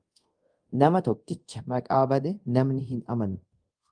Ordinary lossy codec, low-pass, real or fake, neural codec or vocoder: Opus, 32 kbps; 9.9 kHz; fake; codec, 24 kHz, 0.5 kbps, DualCodec